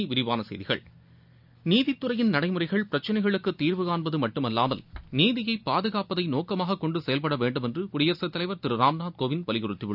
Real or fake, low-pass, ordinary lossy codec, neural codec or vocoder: real; 5.4 kHz; none; none